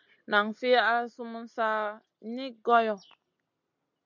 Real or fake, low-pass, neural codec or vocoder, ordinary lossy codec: real; 7.2 kHz; none; MP3, 64 kbps